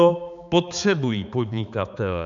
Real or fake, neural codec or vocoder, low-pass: fake; codec, 16 kHz, 4 kbps, X-Codec, HuBERT features, trained on balanced general audio; 7.2 kHz